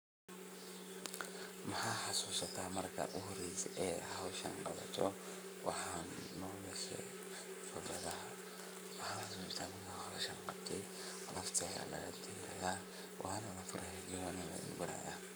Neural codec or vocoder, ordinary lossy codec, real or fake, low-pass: codec, 44.1 kHz, 7.8 kbps, Pupu-Codec; none; fake; none